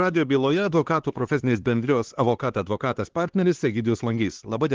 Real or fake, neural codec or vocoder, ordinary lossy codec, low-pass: fake; codec, 16 kHz, 2 kbps, X-Codec, HuBERT features, trained on LibriSpeech; Opus, 16 kbps; 7.2 kHz